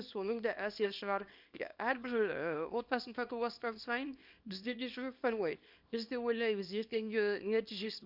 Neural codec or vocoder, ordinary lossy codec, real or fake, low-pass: codec, 24 kHz, 0.9 kbps, WavTokenizer, small release; Opus, 64 kbps; fake; 5.4 kHz